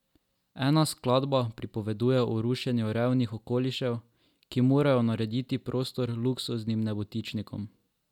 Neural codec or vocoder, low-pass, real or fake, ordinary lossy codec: none; 19.8 kHz; real; none